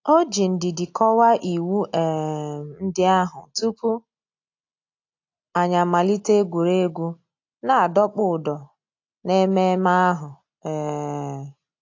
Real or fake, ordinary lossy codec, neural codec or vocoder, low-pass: real; AAC, 48 kbps; none; 7.2 kHz